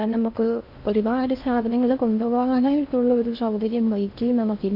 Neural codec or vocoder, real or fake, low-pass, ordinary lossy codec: codec, 16 kHz in and 24 kHz out, 0.6 kbps, FocalCodec, streaming, 4096 codes; fake; 5.4 kHz; none